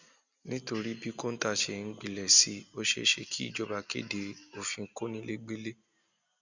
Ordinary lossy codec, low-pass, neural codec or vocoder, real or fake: Opus, 64 kbps; 7.2 kHz; none; real